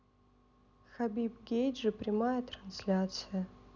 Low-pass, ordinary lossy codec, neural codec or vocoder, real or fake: 7.2 kHz; none; none; real